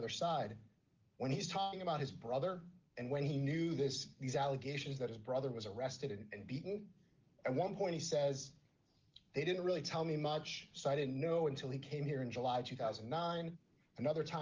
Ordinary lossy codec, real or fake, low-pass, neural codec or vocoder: Opus, 32 kbps; real; 7.2 kHz; none